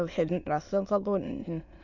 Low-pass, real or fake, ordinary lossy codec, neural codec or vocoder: 7.2 kHz; fake; none; autoencoder, 22.05 kHz, a latent of 192 numbers a frame, VITS, trained on many speakers